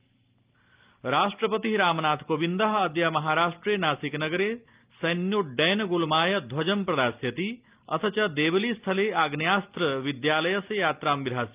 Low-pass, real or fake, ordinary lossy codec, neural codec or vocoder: 3.6 kHz; real; Opus, 24 kbps; none